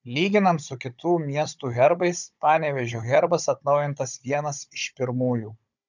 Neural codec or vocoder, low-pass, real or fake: codec, 16 kHz, 16 kbps, FunCodec, trained on Chinese and English, 50 frames a second; 7.2 kHz; fake